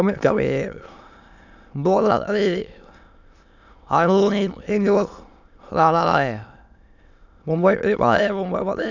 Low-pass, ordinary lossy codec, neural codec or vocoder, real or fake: 7.2 kHz; none; autoencoder, 22.05 kHz, a latent of 192 numbers a frame, VITS, trained on many speakers; fake